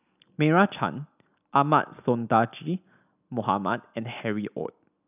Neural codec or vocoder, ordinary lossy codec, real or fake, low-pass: none; none; real; 3.6 kHz